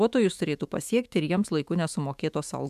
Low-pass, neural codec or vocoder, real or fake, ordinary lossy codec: 14.4 kHz; autoencoder, 48 kHz, 128 numbers a frame, DAC-VAE, trained on Japanese speech; fake; MP3, 96 kbps